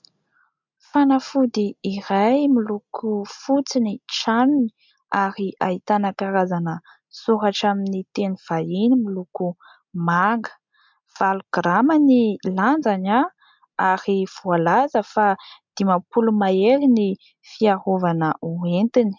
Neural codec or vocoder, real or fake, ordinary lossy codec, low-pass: none; real; MP3, 64 kbps; 7.2 kHz